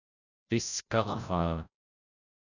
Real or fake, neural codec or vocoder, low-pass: fake; codec, 16 kHz, 0.5 kbps, FreqCodec, larger model; 7.2 kHz